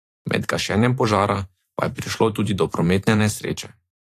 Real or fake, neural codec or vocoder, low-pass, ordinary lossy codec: fake; vocoder, 44.1 kHz, 128 mel bands every 512 samples, BigVGAN v2; 14.4 kHz; AAC, 64 kbps